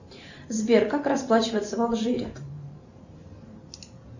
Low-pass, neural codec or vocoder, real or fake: 7.2 kHz; vocoder, 44.1 kHz, 128 mel bands every 512 samples, BigVGAN v2; fake